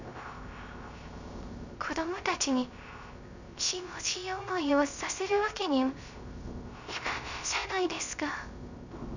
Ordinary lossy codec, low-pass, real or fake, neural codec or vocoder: none; 7.2 kHz; fake; codec, 16 kHz, 0.3 kbps, FocalCodec